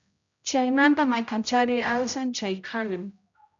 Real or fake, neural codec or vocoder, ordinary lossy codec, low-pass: fake; codec, 16 kHz, 0.5 kbps, X-Codec, HuBERT features, trained on general audio; MP3, 64 kbps; 7.2 kHz